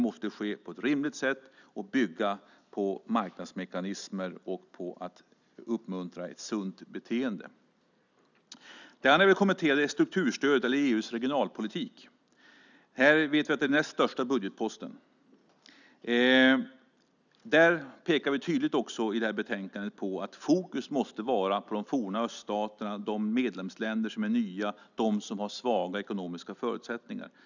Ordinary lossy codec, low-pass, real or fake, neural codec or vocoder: none; 7.2 kHz; real; none